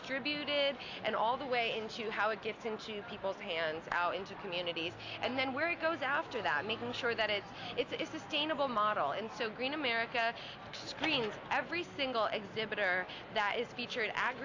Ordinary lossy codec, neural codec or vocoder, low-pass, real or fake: AAC, 48 kbps; none; 7.2 kHz; real